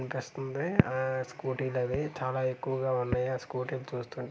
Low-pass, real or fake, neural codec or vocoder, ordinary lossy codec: none; real; none; none